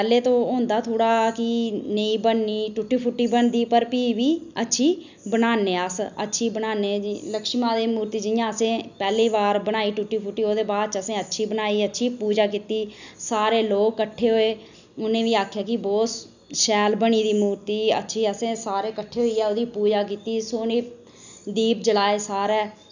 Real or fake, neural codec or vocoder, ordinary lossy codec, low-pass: real; none; none; 7.2 kHz